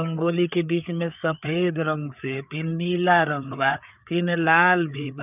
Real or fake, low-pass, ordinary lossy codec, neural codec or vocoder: fake; 3.6 kHz; none; codec, 16 kHz, 4 kbps, FreqCodec, larger model